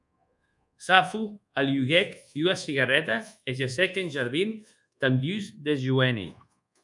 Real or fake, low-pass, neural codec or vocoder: fake; 10.8 kHz; codec, 24 kHz, 1.2 kbps, DualCodec